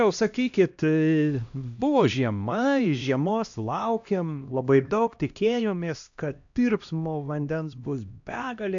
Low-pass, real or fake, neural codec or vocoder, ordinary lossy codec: 7.2 kHz; fake; codec, 16 kHz, 1 kbps, X-Codec, HuBERT features, trained on LibriSpeech; AAC, 64 kbps